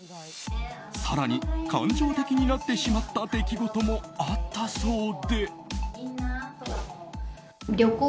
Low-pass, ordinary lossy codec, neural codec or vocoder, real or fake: none; none; none; real